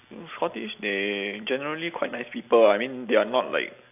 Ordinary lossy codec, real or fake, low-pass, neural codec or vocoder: AAC, 32 kbps; real; 3.6 kHz; none